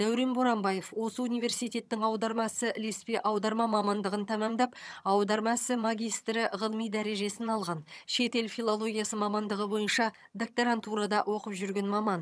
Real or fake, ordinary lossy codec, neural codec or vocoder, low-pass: fake; none; vocoder, 22.05 kHz, 80 mel bands, HiFi-GAN; none